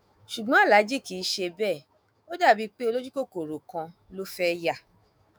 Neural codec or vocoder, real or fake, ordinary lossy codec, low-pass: autoencoder, 48 kHz, 128 numbers a frame, DAC-VAE, trained on Japanese speech; fake; none; none